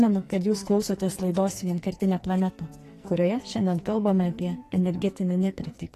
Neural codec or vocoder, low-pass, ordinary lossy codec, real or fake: codec, 44.1 kHz, 2.6 kbps, SNAC; 14.4 kHz; AAC, 48 kbps; fake